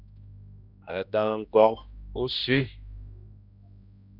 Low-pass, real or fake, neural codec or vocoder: 5.4 kHz; fake; codec, 16 kHz, 1 kbps, X-Codec, HuBERT features, trained on general audio